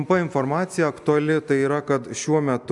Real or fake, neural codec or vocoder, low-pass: real; none; 10.8 kHz